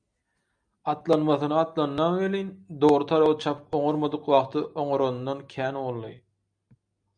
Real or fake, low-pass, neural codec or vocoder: real; 9.9 kHz; none